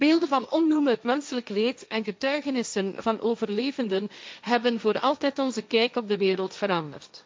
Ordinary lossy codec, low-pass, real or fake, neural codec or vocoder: none; none; fake; codec, 16 kHz, 1.1 kbps, Voila-Tokenizer